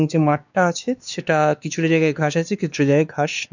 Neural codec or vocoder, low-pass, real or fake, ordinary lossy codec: codec, 16 kHz, 2 kbps, X-Codec, WavLM features, trained on Multilingual LibriSpeech; 7.2 kHz; fake; none